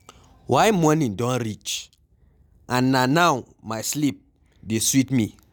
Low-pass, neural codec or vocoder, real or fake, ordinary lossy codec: none; none; real; none